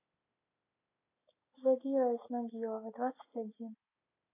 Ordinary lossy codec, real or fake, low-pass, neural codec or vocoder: AAC, 24 kbps; real; 3.6 kHz; none